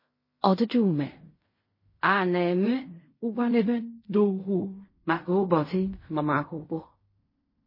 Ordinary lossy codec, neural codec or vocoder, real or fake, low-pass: MP3, 24 kbps; codec, 16 kHz in and 24 kHz out, 0.4 kbps, LongCat-Audio-Codec, fine tuned four codebook decoder; fake; 5.4 kHz